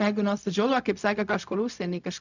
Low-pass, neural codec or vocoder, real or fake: 7.2 kHz; codec, 16 kHz, 0.4 kbps, LongCat-Audio-Codec; fake